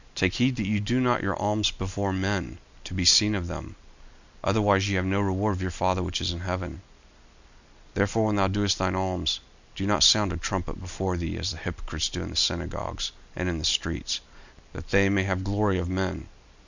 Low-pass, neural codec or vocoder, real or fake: 7.2 kHz; none; real